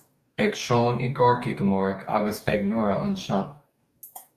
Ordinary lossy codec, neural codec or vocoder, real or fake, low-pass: Opus, 64 kbps; codec, 44.1 kHz, 2.6 kbps, DAC; fake; 14.4 kHz